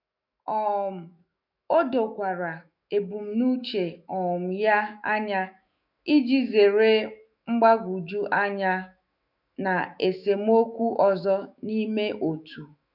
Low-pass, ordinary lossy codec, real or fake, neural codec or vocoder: 5.4 kHz; none; fake; autoencoder, 48 kHz, 128 numbers a frame, DAC-VAE, trained on Japanese speech